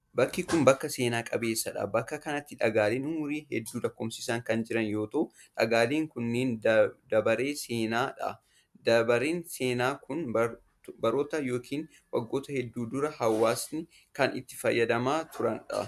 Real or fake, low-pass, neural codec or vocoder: real; 14.4 kHz; none